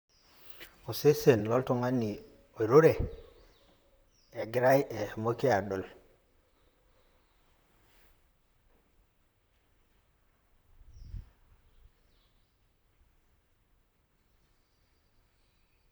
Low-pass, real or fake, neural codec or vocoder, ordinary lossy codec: none; fake; vocoder, 44.1 kHz, 128 mel bands, Pupu-Vocoder; none